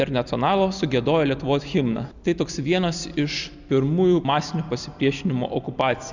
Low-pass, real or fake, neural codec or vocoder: 7.2 kHz; real; none